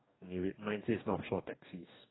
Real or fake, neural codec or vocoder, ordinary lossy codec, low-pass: fake; codec, 44.1 kHz, 2.6 kbps, DAC; AAC, 16 kbps; 7.2 kHz